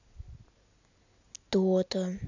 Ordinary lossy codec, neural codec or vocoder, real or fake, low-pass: none; none; real; 7.2 kHz